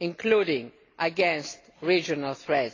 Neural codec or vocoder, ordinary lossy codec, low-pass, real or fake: none; AAC, 32 kbps; 7.2 kHz; real